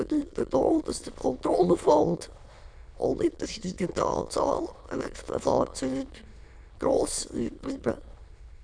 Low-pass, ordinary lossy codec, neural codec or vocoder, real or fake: 9.9 kHz; none; autoencoder, 22.05 kHz, a latent of 192 numbers a frame, VITS, trained on many speakers; fake